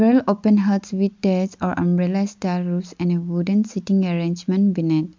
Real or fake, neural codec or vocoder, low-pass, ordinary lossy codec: real; none; 7.2 kHz; MP3, 64 kbps